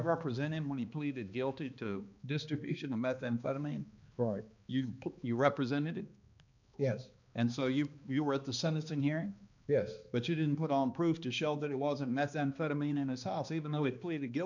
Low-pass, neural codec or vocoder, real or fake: 7.2 kHz; codec, 16 kHz, 2 kbps, X-Codec, HuBERT features, trained on balanced general audio; fake